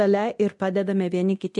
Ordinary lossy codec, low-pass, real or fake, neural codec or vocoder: MP3, 48 kbps; 10.8 kHz; fake; codec, 24 kHz, 0.9 kbps, DualCodec